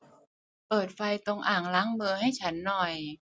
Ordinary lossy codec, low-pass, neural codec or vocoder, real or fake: none; none; none; real